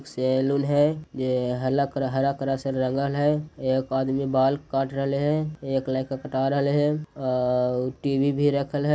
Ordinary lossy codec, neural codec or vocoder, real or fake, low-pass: none; none; real; none